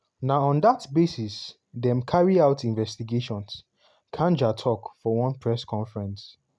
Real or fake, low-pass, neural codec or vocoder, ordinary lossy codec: real; none; none; none